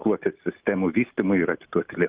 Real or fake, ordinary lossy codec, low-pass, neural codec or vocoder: real; Opus, 32 kbps; 3.6 kHz; none